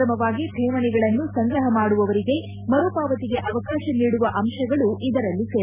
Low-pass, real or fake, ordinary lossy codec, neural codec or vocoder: 3.6 kHz; real; none; none